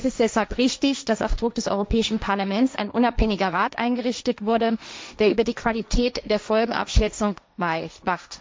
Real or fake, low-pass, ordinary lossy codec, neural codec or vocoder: fake; none; none; codec, 16 kHz, 1.1 kbps, Voila-Tokenizer